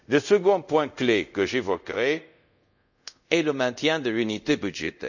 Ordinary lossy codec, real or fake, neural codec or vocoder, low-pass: none; fake; codec, 24 kHz, 0.5 kbps, DualCodec; 7.2 kHz